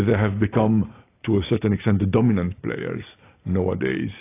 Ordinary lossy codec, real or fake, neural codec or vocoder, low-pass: AAC, 24 kbps; real; none; 3.6 kHz